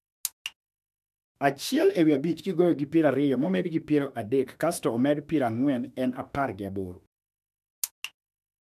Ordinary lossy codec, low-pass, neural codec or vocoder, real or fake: none; 14.4 kHz; autoencoder, 48 kHz, 32 numbers a frame, DAC-VAE, trained on Japanese speech; fake